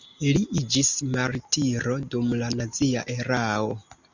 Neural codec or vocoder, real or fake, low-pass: none; real; 7.2 kHz